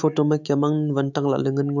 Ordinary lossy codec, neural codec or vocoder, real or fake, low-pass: none; autoencoder, 48 kHz, 128 numbers a frame, DAC-VAE, trained on Japanese speech; fake; 7.2 kHz